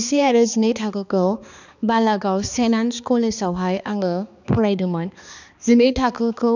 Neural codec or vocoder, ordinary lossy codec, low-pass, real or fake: codec, 16 kHz, 2 kbps, X-Codec, HuBERT features, trained on balanced general audio; none; 7.2 kHz; fake